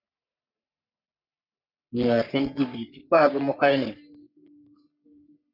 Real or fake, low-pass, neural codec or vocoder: fake; 5.4 kHz; codec, 44.1 kHz, 3.4 kbps, Pupu-Codec